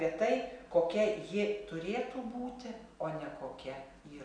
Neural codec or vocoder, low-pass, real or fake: none; 9.9 kHz; real